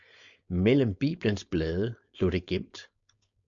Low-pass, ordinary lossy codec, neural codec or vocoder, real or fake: 7.2 kHz; AAC, 64 kbps; codec, 16 kHz, 4.8 kbps, FACodec; fake